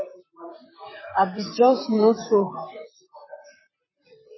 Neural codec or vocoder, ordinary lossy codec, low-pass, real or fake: vocoder, 44.1 kHz, 128 mel bands, Pupu-Vocoder; MP3, 24 kbps; 7.2 kHz; fake